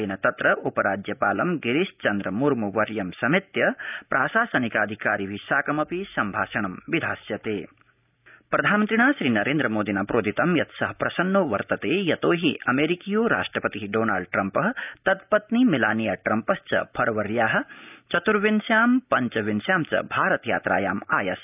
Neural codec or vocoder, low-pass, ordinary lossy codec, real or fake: none; 3.6 kHz; none; real